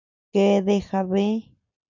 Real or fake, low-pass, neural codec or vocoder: real; 7.2 kHz; none